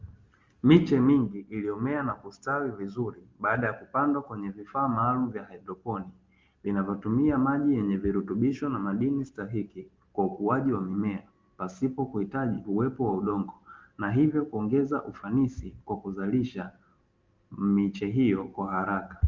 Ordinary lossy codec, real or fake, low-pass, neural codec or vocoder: Opus, 32 kbps; real; 7.2 kHz; none